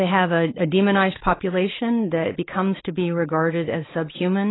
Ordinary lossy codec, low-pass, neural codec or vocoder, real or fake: AAC, 16 kbps; 7.2 kHz; none; real